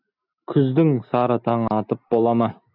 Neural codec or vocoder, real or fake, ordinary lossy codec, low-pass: none; real; AAC, 48 kbps; 5.4 kHz